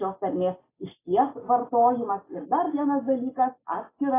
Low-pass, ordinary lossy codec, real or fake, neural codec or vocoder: 3.6 kHz; AAC, 16 kbps; real; none